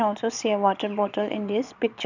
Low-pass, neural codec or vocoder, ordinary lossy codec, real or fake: 7.2 kHz; vocoder, 22.05 kHz, 80 mel bands, Vocos; none; fake